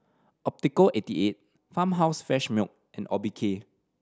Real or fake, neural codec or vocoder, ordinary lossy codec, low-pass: real; none; none; none